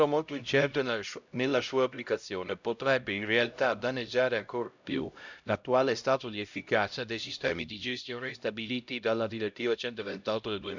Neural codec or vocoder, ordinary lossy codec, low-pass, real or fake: codec, 16 kHz, 0.5 kbps, X-Codec, HuBERT features, trained on LibriSpeech; none; 7.2 kHz; fake